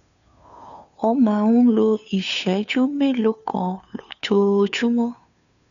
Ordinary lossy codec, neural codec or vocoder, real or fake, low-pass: none; codec, 16 kHz, 2 kbps, FunCodec, trained on Chinese and English, 25 frames a second; fake; 7.2 kHz